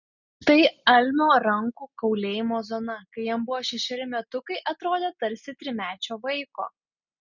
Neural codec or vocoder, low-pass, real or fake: none; 7.2 kHz; real